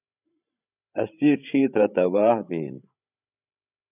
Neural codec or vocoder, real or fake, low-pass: codec, 16 kHz, 16 kbps, FreqCodec, larger model; fake; 3.6 kHz